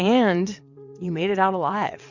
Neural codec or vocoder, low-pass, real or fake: vocoder, 22.05 kHz, 80 mel bands, WaveNeXt; 7.2 kHz; fake